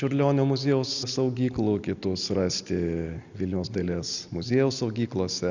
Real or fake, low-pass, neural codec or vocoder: real; 7.2 kHz; none